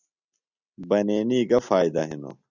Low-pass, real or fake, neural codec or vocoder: 7.2 kHz; real; none